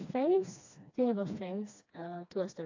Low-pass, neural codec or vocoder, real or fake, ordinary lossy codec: 7.2 kHz; codec, 16 kHz, 2 kbps, FreqCodec, smaller model; fake; AAC, 48 kbps